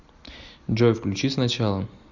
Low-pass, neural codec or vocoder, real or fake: 7.2 kHz; none; real